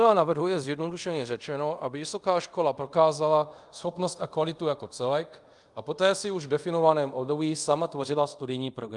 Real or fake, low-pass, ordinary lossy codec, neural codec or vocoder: fake; 10.8 kHz; Opus, 32 kbps; codec, 24 kHz, 0.5 kbps, DualCodec